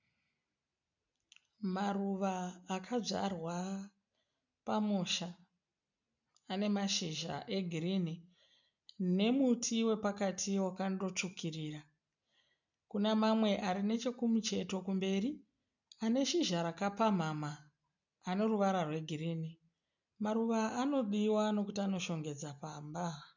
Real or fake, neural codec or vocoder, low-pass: real; none; 7.2 kHz